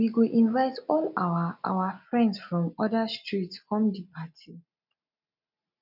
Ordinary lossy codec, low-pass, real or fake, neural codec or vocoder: none; 5.4 kHz; real; none